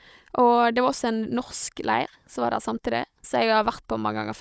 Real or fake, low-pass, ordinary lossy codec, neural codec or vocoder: fake; none; none; codec, 16 kHz, 16 kbps, FunCodec, trained on Chinese and English, 50 frames a second